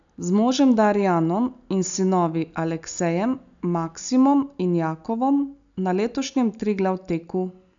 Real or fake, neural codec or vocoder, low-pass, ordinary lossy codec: real; none; 7.2 kHz; none